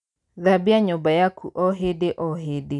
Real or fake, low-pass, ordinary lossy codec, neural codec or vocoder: real; 10.8 kHz; none; none